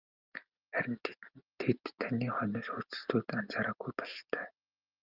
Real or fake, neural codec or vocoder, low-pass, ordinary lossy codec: real; none; 5.4 kHz; Opus, 32 kbps